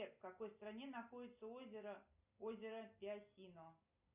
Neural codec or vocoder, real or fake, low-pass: none; real; 3.6 kHz